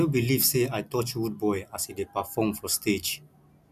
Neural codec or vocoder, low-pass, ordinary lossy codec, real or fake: none; 14.4 kHz; none; real